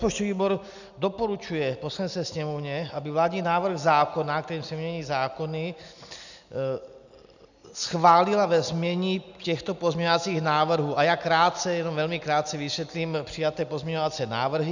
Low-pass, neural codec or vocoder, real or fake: 7.2 kHz; none; real